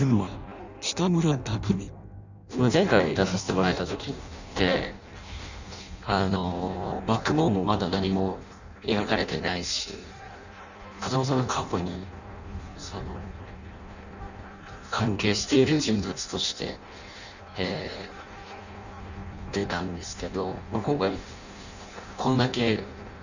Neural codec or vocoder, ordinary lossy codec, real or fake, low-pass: codec, 16 kHz in and 24 kHz out, 0.6 kbps, FireRedTTS-2 codec; none; fake; 7.2 kHz